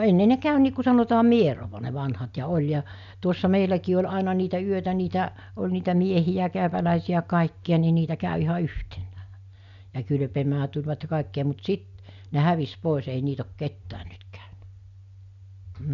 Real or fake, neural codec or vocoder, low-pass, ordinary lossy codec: real; none; 7.2 kHz; none